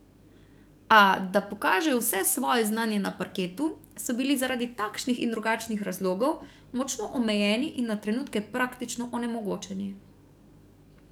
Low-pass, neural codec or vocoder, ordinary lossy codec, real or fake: none; codec, 44.1 kHz, 7.8 kbps, DAC; none; fake